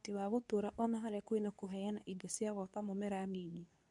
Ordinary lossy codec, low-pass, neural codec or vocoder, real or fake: none; 10.8 kHz; codec, 24 kHz, 0.9 kbps, WavTokenizer, medium speech release version 2; fake